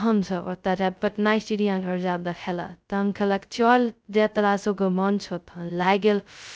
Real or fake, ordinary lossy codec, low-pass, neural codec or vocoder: fake; none; none; codec, 16 kHz, 0.2 kbps, FocalCodec